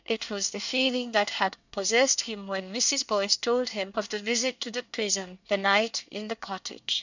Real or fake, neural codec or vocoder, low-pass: fake; codec, 24 kHz, 1 kbps, SNAC; 7.2 kHz